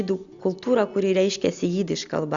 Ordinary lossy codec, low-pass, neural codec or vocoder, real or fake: Opus, 64 kbps; 7.2 kHz; none; real